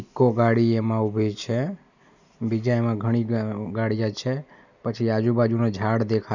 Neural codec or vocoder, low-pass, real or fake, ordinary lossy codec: none; 7.2 kHz; real; none